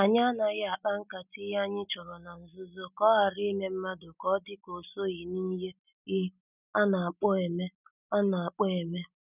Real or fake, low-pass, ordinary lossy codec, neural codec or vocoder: real; 3.6 kHz; none; none